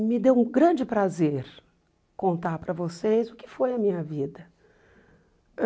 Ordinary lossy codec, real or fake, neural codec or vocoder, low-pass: none; real; none; none